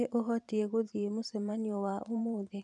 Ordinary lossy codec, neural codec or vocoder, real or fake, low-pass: none; vocoder, 44.1 kHz, 128 mel bands every 512 samples, BigVGAN v2; fake; 10.8 kHz